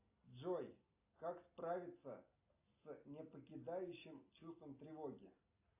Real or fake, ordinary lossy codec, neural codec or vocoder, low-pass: real; AAC, 32 kbps; none; 3.6 kHz